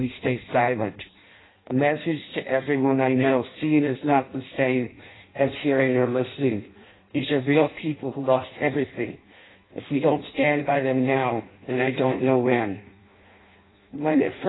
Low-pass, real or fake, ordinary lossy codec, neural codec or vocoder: 7.2 kHz; fake; AAC, 16 kbps; codec, 16 kHz in and 24 kHz out, 0.6 kbps, FireRedTTS-2 codec